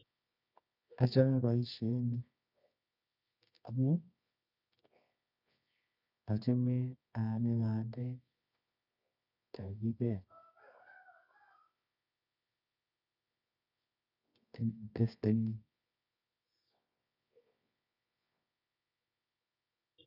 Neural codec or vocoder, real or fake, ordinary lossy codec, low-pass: codec, 24 kHz, 0.9 kbps, WavTokenizer, medium music audio release; fake; none; 5.4 kHz